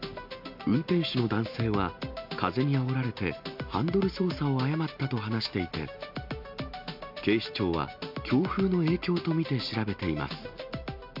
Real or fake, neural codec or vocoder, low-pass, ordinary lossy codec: real; none; 5.4 kHz; MP3, 48 kbps